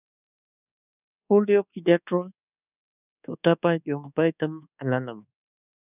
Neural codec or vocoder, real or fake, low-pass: codec, 24 kHz, 1.2 kbps, DualCodec; fake; 3.6 kHz